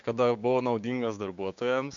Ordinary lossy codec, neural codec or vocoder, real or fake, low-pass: MP3, 64 kbps; codec, 16 kHz, 6 kbps, DAC; fake; 7.2 kHz